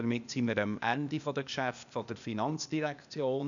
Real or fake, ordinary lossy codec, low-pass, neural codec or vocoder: fake; none; 7.2 kHz; codec, 16 kHz, 0.8 kbps, ZipCodec